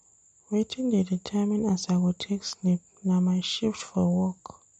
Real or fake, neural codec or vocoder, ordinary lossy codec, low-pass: real; none; MP3, 48 kbps; 19.8 kHz